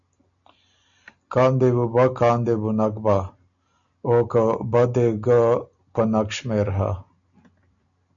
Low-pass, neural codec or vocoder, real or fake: 7.2 kHz; none; real